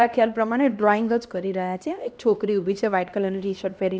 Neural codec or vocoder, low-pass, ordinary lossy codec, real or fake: codec, 16 kHz, 1 kbps, X-Codec, HuBERT features, trained on LibriSpeech; none; none; fake